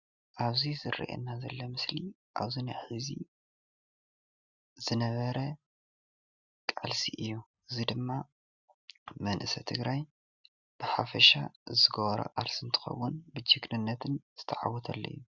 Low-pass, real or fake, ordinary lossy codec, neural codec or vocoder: 7.2 kHz; real; Opus, 64 kbps; none